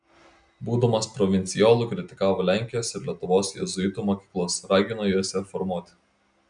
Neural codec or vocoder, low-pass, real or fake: none; 9.9 kHz; real